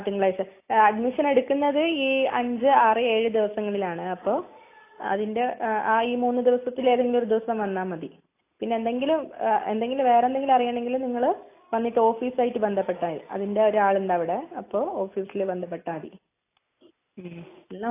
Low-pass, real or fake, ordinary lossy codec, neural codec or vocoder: 3.6 kHz; real; AAC, 24 kbps; none